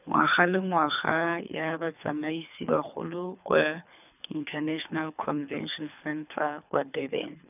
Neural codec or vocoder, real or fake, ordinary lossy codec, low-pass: codec, 24 kHz, 3 kbps, HILCodec; fake; none; 3.6 kHz